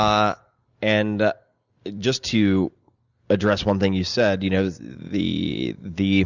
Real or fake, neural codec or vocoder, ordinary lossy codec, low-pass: real; none; Opus, 64 kbps; 7.2 kHz